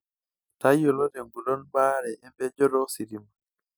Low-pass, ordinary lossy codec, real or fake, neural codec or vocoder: none; none; real; none